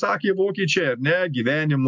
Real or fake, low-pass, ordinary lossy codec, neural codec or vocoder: real; 7.2 kHz; MP3, 64 kbps; none